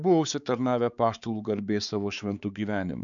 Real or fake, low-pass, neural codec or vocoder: fake; 7.2 kHz; codec, 16 kHz, 4 kbps, X-Codec, HuBERT features, trained on balanced general audio